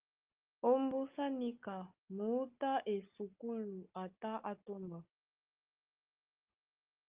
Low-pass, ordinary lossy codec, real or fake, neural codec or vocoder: 3.6 kHz; Opus, 24 kbps; real; none